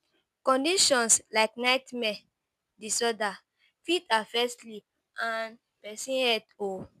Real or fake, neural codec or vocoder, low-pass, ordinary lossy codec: real; none; 14.4 kHz; none